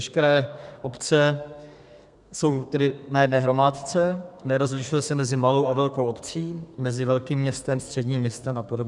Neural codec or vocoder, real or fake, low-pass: codec, 32 kHz, 1.9 kbps, SNAC; fake; 10.8 kHz